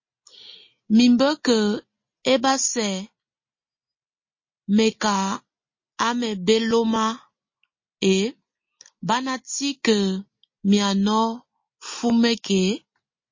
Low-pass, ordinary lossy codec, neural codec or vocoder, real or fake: 7.2 kHz; MP3, 32 kbps; none; real